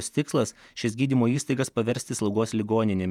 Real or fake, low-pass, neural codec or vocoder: fake; 19.8 kHz; vocoder, 48 kHz, 128 mel bands, Vocos